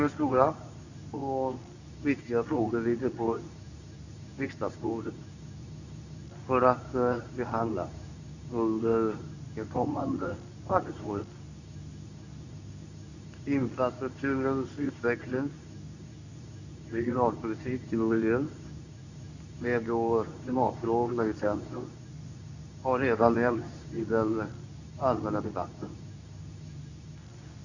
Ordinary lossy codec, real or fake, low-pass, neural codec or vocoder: none; fake; 7.2 kHz; codec, 24 kHz, 0.9 kbps, WavTokenizer, medium speech release version 2